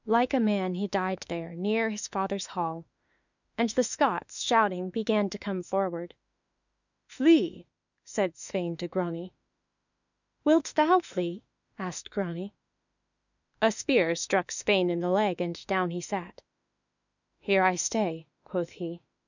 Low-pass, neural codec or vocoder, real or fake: 7.2 kHz; autoencoder, 48 kHz, 32 numbers a frame, DAC-VAE, trained on Japanese speech; fake